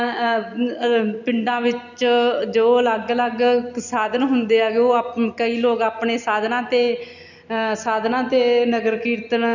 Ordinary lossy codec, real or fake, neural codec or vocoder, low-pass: none; real; none; 7.2 kHz